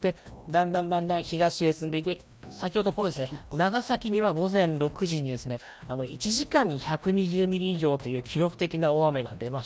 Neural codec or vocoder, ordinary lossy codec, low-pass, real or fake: codec, 16 kHz, 1 kbps, FreqCodec, larger model; none; none; fake